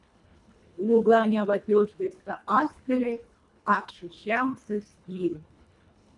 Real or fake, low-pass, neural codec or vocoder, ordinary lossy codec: fake; 10.8 kHz; codec, 24 kHz, 1.5 kbps, HILCodec; MP3, 96 kbps